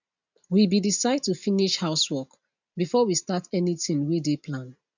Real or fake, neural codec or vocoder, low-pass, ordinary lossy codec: real; none; 7.2 kHz; none